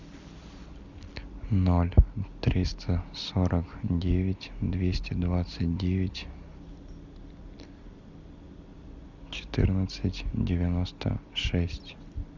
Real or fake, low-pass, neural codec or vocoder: real; 7.2 kHz; none